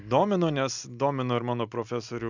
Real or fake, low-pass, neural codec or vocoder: real; 7.2 kHz; none